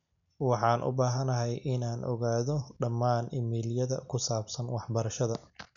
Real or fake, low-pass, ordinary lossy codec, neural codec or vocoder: real; 7.2 kHz; none; none